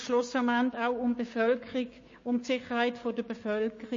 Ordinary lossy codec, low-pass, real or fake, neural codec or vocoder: MP3, 32 kbps; 7.2 kHz; fake; codec, 16 kHz, 6 kbps, DAC